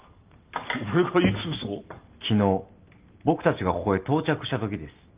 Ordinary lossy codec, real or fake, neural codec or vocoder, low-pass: Opus, 32 kbps; real; none; 3.6 kHz